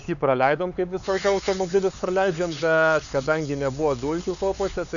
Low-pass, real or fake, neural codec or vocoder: 7.2 kHz; fake; codec, 16 kHz, 4 kbps, X-Codec, HuBERT features, trained on LibriSpeech